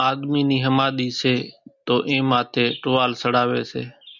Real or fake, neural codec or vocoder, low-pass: real; none; 7.2 kHz